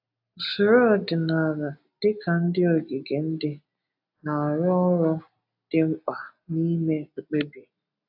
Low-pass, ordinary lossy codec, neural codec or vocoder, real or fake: 5.4 kHz; AAC, 48 kbps; none; real